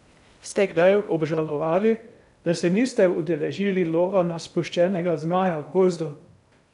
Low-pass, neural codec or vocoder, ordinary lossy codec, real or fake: 10.8 kHz; codec, 16 kHz in and 24 kHz out, 0.6 kbps, FocalCodec, streaming, 2048 codes; none; fake